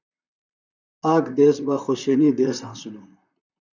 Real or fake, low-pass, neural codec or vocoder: fake; 7.2 kHz; vocoder, 44.1 kHz, 128 mel bands, Pupu-Vocoder